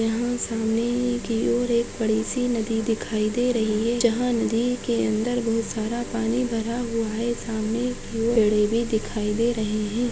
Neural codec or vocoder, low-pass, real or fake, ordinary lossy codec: none; none; real; none